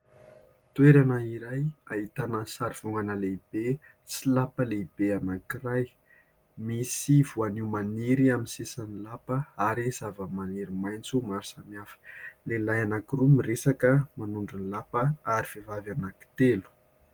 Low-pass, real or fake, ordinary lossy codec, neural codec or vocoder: 19.8 kHz; real; Opus, 24 kbps; none